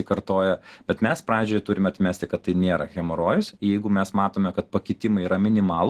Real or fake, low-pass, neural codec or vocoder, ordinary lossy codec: real; 14.4 kHz; none; Opus, 16 kbps